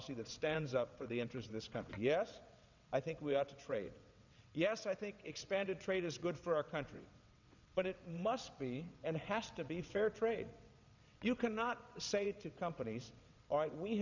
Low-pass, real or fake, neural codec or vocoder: 7.2 kHz; fake; vocoder, 22.05 kHz, 80 mel bands, WaveNeXt